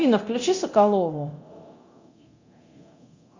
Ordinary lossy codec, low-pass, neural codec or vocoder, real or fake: Opus, 64 kbps; 7.2 kHz; codec, 24 kHz, 0.9 kbps, DualCodec; fake